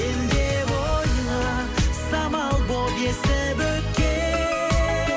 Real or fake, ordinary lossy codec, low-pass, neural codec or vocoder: real; none; none; none